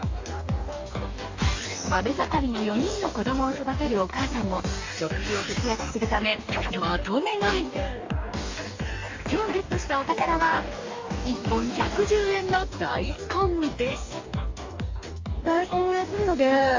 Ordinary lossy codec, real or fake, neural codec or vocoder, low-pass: AAC, 48 kbps; fake; codec, 44.1 kHz, 2.6 kbps, DAC; 7.2 kHz